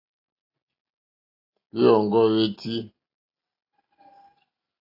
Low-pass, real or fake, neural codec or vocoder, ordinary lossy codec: 5.4 kHz; real; none; AAC, 24 kbps